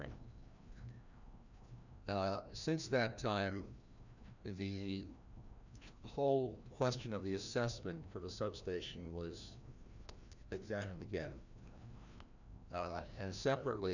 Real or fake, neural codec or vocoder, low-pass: fake; codec, 16 kHz, 1 kbps, FreqCodec, larger model; 7.2 kHz